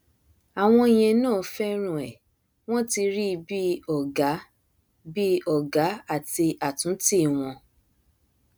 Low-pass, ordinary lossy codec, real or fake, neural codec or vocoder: none; none; real; none